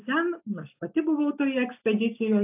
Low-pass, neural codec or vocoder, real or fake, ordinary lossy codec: 3.6 kHz; none; real; AAC, 32 kbps